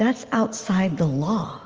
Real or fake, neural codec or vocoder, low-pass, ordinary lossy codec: real; none; 7.2 kHz; Opus, 24 kbps